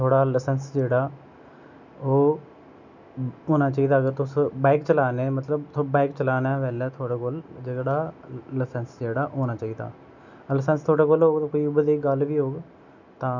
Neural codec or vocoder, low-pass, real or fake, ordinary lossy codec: none; 7.2 kHz; real; none